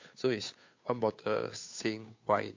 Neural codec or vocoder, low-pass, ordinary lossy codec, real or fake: codec, 16 kHz, 2 kbps, FunCodec, trained on Chinese and English, 25 frames a second; 7.2 kHz; MP3, 48 kbps; fake